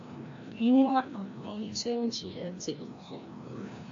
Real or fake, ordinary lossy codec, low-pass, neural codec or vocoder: fake; AAC, 48 kbps; 7.2 kHz; codec, 16 kHz, 1 kbps, FreqCodec, larger model